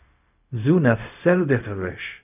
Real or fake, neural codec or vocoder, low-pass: fake; codec, 16 kHz, 0.4 kbps, LongCat-Audio-Codec; 3.6 kHz